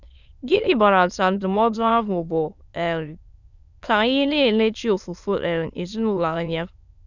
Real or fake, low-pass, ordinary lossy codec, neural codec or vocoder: fake; 7.2 kHz; none; autoencoder, 22.05 kHz, a latent of 192 numbers a frame, VITS, trained on many speakers